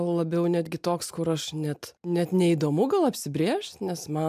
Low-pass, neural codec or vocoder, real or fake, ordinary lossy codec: 14.4 kHz; none; real; MP3, 96 kbps